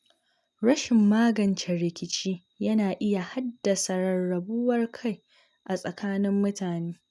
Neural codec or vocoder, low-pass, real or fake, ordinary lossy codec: none; none; real; none